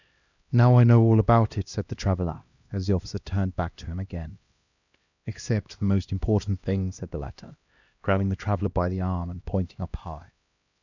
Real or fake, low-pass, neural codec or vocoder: fake; 7.2 kHz; codec, 16 kHz, 1 kbps, X-Codec, HuBERT features, trained on LibriSpeech